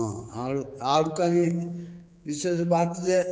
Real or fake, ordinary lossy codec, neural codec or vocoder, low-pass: fake; none; codec, 16 kHz, 4 kbps, X-Codec, HuBERT features, trained on general audio; none